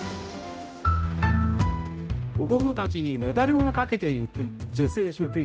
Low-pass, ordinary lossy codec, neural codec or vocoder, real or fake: none; none; codec, 16 kHz, 0.5 kbps, X-Codec, HuBERT features, trained on general audio; fake